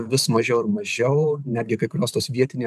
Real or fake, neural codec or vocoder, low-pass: fake; vocoder, 44.1 kHz, 128 mel bands every 512 samples, BigVGAN v2; 14.4 kHz